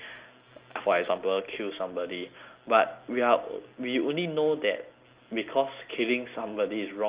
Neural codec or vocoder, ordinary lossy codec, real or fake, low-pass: none; Opus, 32 kbps; real; 3.6 kHz